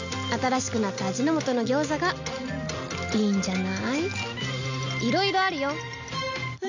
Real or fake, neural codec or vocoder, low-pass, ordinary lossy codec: real; none; 7.2 kHz; none